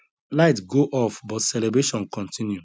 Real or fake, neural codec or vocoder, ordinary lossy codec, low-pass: real; none; none; none